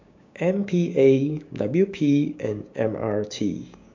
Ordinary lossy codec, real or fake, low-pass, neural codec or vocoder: MP3, 64 kbps; fake; 7.2 kHz; codec, 16 kHz, 6 kbps, DAC